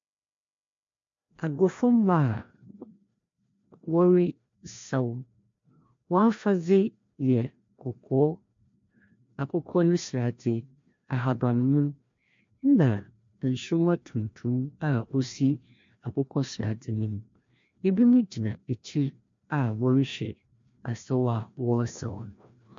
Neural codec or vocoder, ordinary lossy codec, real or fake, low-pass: codec, 16 kHz, 1 kbps, FreqCodec, larger model; MP3, 48 kbps; fake; 7.2 kHz